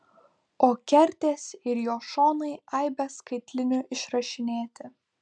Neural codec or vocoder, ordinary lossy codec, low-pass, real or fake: none; MP3, 96 kbps; 9.9 kHz; real